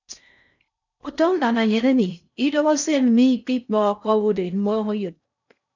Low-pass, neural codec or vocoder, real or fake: 7.2 kHz; codec, 16 kHz in and 24 kHz out, 0.6 kbps, FocalCodec, streaming, 4096 codes; fake